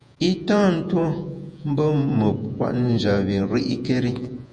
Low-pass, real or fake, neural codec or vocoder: 9.9 kHz; fake; vocoder, 48 kHz, 128 mel bands, Vocos